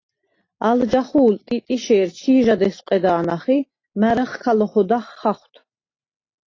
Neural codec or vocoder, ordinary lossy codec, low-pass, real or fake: none; AAC, 32 kbps; 7.2 kHz; real